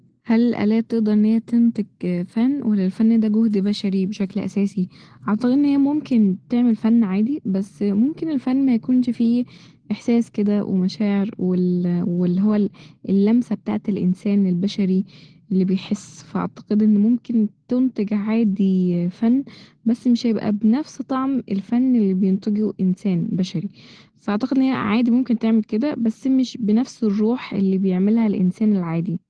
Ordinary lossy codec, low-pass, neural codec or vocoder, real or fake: Opus, 16 kbps; 9.9 kHz; none; real